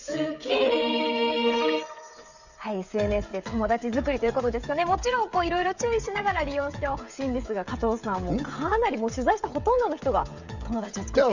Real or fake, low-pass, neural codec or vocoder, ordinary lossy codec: fake; 7.2 kHz; codec, 16 kHz, 16 kbps, FreqCodec, smaller model; none